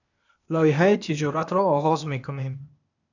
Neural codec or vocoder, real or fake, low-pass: codec, 16 kHz, 0.8 kbps, ZipCodec; fake; 7.2 kHz